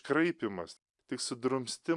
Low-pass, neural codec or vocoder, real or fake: 10.8 kHz; none; real